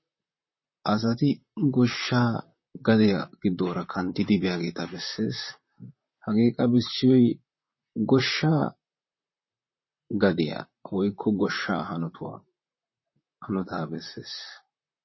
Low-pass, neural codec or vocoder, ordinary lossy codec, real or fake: 7.2 kHz; vocoder, 44.1 kHz, 128 mel bands, Pupu-Vocoder; MP3, 24 kbps; fake